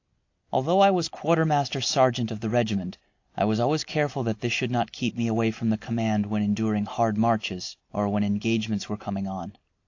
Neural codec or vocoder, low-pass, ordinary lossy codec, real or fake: vocoder, 44.1 kHz, 128 mel bands every 256 samples, BigVGAN v2; 7.2 kHz; AAC, 48 kbps; fake